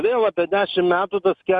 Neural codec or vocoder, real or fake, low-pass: none; real; 10.8 kHz